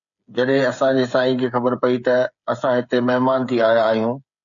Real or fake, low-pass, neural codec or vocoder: fake; 7.2 kHz; codec, 16 kHz, 8 kbps, FreqCodec, smaller model